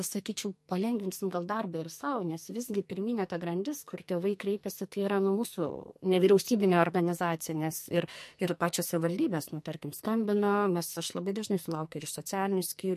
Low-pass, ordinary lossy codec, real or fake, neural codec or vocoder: 14.4 kHz; MP3, 64 kbps; fake; codec, 44.1 kHz, 2.6 kbps, SNAC